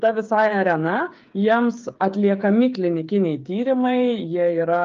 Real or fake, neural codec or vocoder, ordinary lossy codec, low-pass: fake; codec, 16 kHz, 8 kbps, FreqCodec, smaller model; Opus, 32 kbps; 7.2 kHz